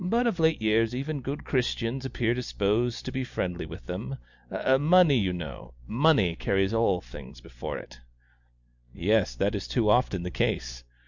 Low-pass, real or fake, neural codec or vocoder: 7.2 kHz; real; none